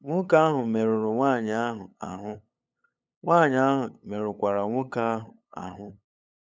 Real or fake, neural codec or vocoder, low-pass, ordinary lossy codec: fake; codec, 16 kHz, 8 kbps, FunCodec, trained on LibriTTS, 25 frames a second; none; none